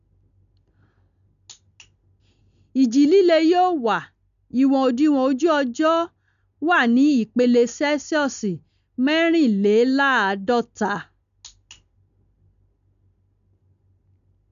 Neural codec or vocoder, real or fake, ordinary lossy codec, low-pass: none; real; none; 7.2 kHz